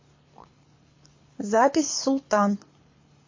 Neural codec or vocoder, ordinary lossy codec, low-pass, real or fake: codec, 24 kHz, 6 kbps, HILCodec; MP3, 32 kbps; 7.2 kHz; fake